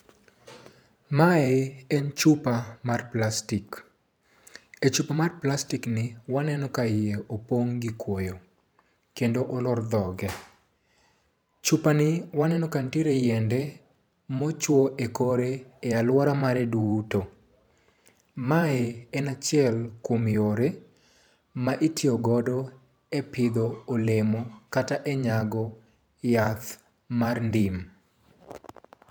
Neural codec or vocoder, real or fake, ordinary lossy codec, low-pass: vocoder, 44.1 kHz, 128 mel bands every 512 samples, BigVGAN v2; fake; none; none